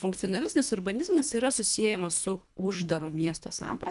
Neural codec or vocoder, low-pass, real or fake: codec, 24 kHz, 1.5 kbps, HILCodec; 10.8 kHz; fake